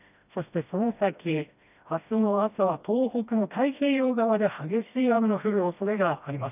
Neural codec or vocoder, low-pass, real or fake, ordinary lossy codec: codec, 16 kHz, 1 kbps, FreqCodec, smaller model; 3.6 kHz; fake; none